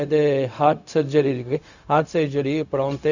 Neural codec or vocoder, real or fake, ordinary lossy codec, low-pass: codec, 16 kHz, 0.4 kbps, LongCat-Audio-Codec; fake; none; 7.2 kHz